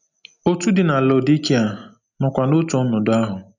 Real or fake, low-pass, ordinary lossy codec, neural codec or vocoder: real; 7.2 kHz; none; none